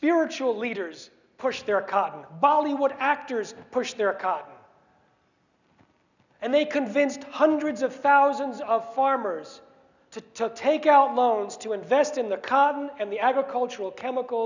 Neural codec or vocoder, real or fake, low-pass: none; real; 7.2 kHz